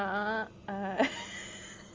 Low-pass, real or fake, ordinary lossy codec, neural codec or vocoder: 7.2 kHz; real; Opus, 32 kbps; none